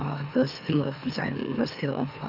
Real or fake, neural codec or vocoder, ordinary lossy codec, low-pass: fake; autoencoder, 44.1 kHz, a latent of 192 numbers a frame, MeloTTS; none; 5.4 kHz